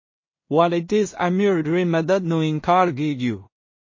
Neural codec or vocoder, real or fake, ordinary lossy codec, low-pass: codec, 16 kHz in and 24 kHz out, 0.4 kbps, LongCat-Audio-Codec, two codebook decoder; fake; MP3, 32 kbps; 7.2 kHz